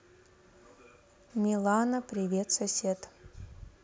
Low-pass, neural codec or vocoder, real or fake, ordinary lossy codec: none; none; real; none